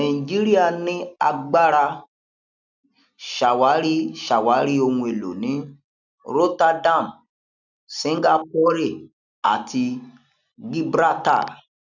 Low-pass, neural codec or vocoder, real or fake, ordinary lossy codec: 7.2 kHz; none; real; none